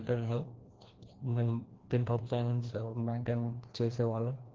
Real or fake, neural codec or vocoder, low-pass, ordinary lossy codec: fake; codec, 16 kHz, 1 kbps, FreqCodec, larger model; 7.2 kHz; Opus, 16 kbps